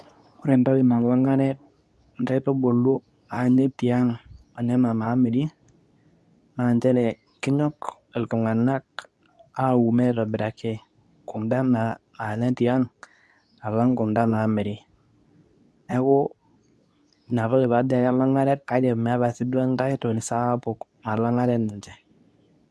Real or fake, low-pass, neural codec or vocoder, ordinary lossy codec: fake; none; codec, 24 kHz, 0.9 kbps, WavTokenizer, medium speech release version 2; none